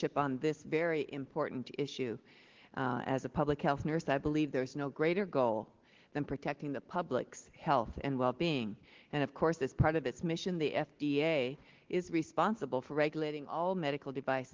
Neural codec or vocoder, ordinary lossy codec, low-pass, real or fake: none; Opus, 16 kbps; 7.2 kHz; real